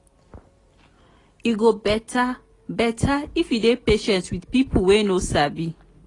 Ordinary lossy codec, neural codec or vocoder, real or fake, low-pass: AAC, 32 kbps; none; real; 10.8 kHz